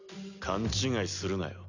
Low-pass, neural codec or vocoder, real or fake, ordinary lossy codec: 7.2 kHz; none; real; none